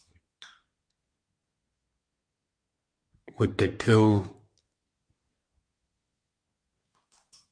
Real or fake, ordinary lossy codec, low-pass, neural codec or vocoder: fake; MP3, 48 kbps; 9.9 kHz; codec, 24 kHz, 1 kbps, SNAC